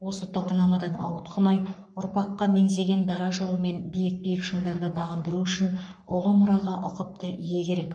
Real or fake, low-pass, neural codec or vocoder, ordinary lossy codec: fake; 9.9 kHz; codec, 44.1 kHz, 3.4 kbps, Pupu-Codec; none